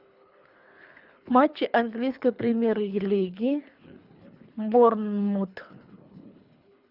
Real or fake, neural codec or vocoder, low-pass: fake; codec, 24 kHz, 3 kbps, HILCodec; 5.4 kHz